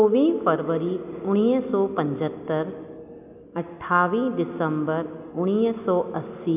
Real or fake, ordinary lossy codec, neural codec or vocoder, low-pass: real; AAC, 32 kbps; none; 3.6 kHz